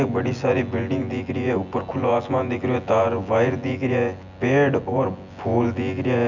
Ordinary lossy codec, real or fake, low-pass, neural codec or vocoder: none; fake; 7.2 kHz; vocoder, 24 kHz, 100 mel bands, Vocos